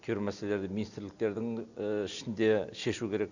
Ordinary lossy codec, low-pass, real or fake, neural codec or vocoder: none; 7.2 kHz; real; none